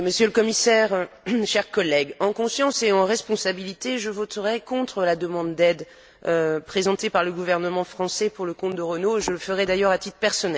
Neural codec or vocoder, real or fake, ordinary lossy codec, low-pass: none; real; none; none